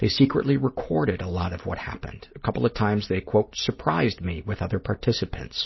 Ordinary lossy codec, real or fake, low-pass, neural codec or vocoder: MP3, 24 kbps; real; 7.2 kHz; none